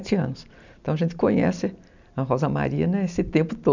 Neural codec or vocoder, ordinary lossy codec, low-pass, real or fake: none; none; 7.2 kHz; real